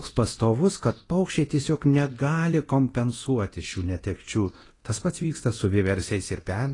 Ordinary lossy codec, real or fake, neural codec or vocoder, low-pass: AAC, 32 kbps; fake; codec, 24 kHz, 0.9 kbps, DualCodec; 10.8 kHz